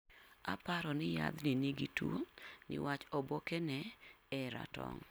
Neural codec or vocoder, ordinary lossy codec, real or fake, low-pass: none; none; real; none